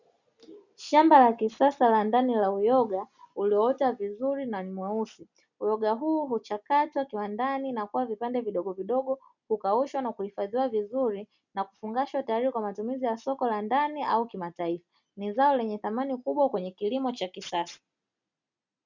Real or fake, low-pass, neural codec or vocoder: real; 7.2 kHz; none